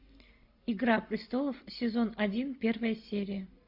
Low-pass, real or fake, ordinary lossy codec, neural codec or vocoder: 5.4 kHz; real; AAC, 48 kbps; none